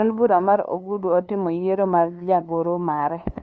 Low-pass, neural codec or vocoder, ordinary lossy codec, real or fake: none; codec, 16 kHz, 2 kbps, FunCodec, trained on LibriTTS, 25 frames a second; none; fake